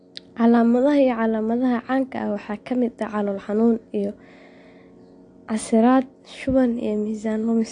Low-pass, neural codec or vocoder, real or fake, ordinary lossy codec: 9.9 kHz; none; real; AAC, 48 kbps